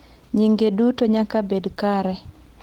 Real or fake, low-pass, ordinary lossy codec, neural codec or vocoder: real; 19.8 kHz; Opus, 16 kbps; none